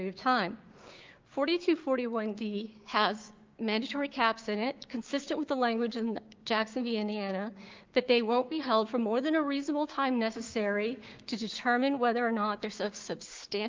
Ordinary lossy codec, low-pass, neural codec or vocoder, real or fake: Opus, 32 kbps; 7.2 kHz; codec, 16 kHz, 6 kbps, DAC; fake